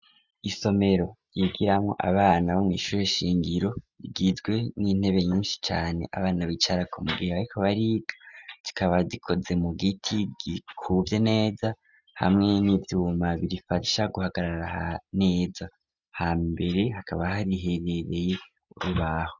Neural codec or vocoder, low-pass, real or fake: none; 7.2 kHz; real